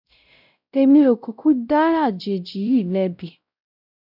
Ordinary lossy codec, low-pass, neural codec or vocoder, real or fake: none; 5.4 kHz; codec, 16 kHz, 0.5 kbps, X-Codec, WavLM features, trained on Multilingual LibriSpeech; fake